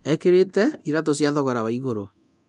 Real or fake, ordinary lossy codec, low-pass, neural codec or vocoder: fake; none; 10.8 kHz; codec, 24 kHz, 0.9 kbps, DualCodec